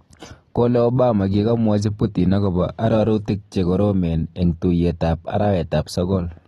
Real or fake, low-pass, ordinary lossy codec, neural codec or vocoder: real; 19.8 kHz; AAC, 32 kbps; none